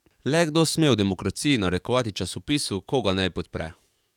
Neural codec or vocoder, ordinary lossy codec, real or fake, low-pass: codec, 44.1 kHz, 7.8 kbps, DAC; none; fake; 19.8 kHz